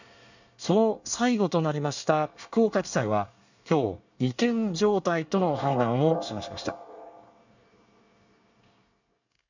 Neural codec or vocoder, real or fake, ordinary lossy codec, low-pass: codec, 24 kHz, 1 kbps, SNAC; fake; none; 7.2 kHz